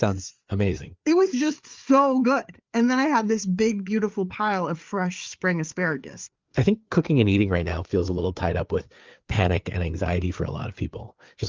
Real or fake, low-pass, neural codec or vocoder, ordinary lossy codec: fake; 7.2 kHz; codec, 16 kHz, 4 kbps, FreqCodec, larger model; Opus, 32 kbps